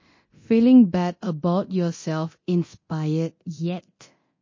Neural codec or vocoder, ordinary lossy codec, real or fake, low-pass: codec, 24 kHz, 0.9 kbps, DualCodec; MP3, 32 kbps; fake; 7.2 kHz